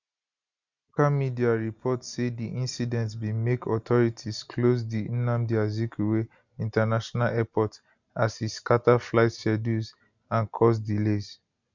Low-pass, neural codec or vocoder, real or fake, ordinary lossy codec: 7.2 kHz; none; real; none